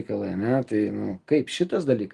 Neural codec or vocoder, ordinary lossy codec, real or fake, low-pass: none; Opus, 24 kbps; real; 10.8 kHz